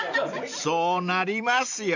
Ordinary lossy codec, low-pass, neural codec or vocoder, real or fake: none; 7.2 kHz; none; real